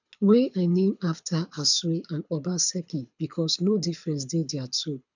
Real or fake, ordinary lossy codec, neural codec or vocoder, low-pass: fake; none; codec, 24 kHz, 6 kbps, HILCodec; 7.2 kHz